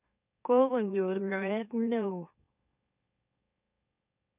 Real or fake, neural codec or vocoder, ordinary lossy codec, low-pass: fake; autoencoder, 44.1 kHz, a latent of 192 numbers a frame, MeloTTS; none; 3.6 kHz